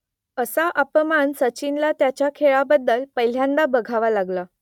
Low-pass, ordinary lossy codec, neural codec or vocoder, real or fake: 19.8 kHz; none; none; real